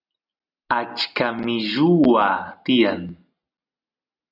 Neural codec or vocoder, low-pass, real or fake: none; 5.4 kHz; real